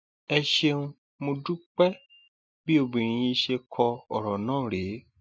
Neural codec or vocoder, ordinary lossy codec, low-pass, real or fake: none; none; none; real